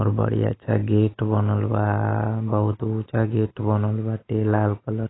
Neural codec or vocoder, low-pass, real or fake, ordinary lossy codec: none; 7.2 kHz; real; AAC, 16 kbps